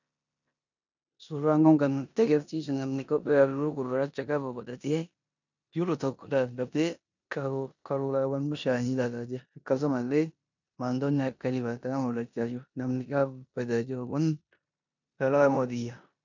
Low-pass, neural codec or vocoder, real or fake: 7.2 kHz; codec, 16 kHz in and 24 kHz out, 0.9 kbps, LongCat-Audio-Codec, four codebook decoder; fake